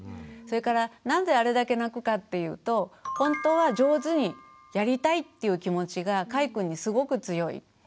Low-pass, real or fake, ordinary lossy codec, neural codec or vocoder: none; real; none; none